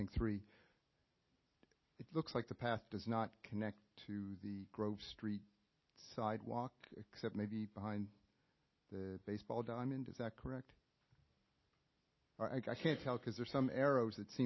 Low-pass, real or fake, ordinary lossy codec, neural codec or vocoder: 7.2 kHz; real; MP3, 24 kbps; none